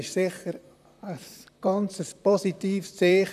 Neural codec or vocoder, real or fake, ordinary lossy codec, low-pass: vocoder, 44.1 kHz, 128 mel bands, Pupu-Vocoder; fake; none; 14.4 kHz